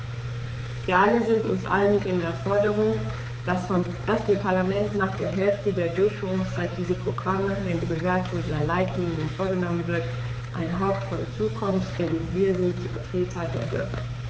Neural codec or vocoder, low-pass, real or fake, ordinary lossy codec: codec, 16 kHz, 4 kbps, X-Codec, HuBERT features, trained on balanced general audio; none; fake; none